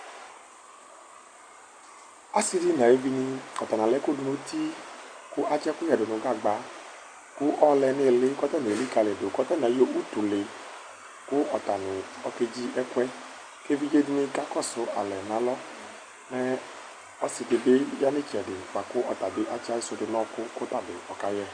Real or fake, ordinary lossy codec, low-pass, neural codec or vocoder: real; Opus, 64 kbps; 9.9 kHz; none